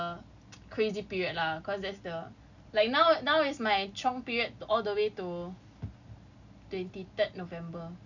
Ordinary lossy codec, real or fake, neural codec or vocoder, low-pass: none; real; none; 7.2 kHz